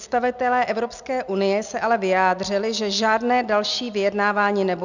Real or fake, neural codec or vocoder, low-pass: real; none; 7.2 kHz